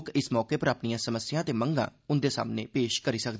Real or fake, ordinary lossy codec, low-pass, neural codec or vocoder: real; none; none; none